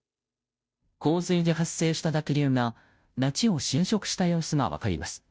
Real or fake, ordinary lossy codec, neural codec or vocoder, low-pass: fake; none; codec, 16 kHz, 0.5 kbps, FunCodec, trained on Chinese and English, 25 frames a second; none